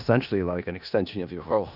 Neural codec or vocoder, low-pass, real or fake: codec, 16 kHz in and 24 kHz out, 0.4 kbps, LongCat-Audio-Codec, four codebook decoder; 5.4 kHz; fake